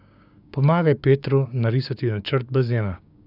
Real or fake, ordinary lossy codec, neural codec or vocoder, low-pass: fake; none; codec, 16 kHz, 6 kbps, DAC; 5.4 kHz